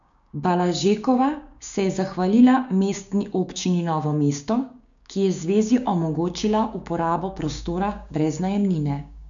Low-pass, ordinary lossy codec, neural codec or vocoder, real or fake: 7.2 kHz; none; codec, 16 kHz, 6 kbps, DAC; fake